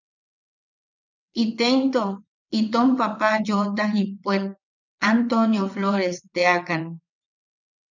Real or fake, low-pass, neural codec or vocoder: fake; 7.2 kHz; vocoder, 22.05 kHz, 80 mel bands, WaveNeXt